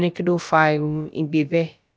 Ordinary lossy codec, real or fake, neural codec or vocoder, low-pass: none; fake; codec, 16 kHz, about 1 kbps, DyCAST, with the encoder's durations; none